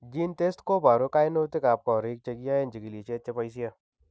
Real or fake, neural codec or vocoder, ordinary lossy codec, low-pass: real; none; none; none